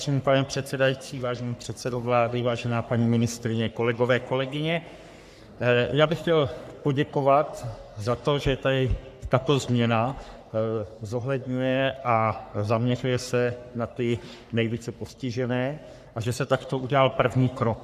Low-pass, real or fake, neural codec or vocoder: 14.4 kHz; fake; codec, 44.1 kHz, 3.4 kbps, Pupu-Codec